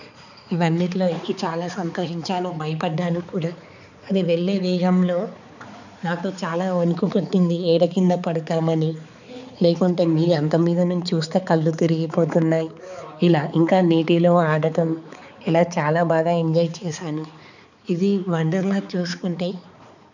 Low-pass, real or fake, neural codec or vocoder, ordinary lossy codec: 7.2 kHz; fake; codec, 16 kHz, 4 kbps, X-Codec, HuBERT features, trained on balanced general audio; none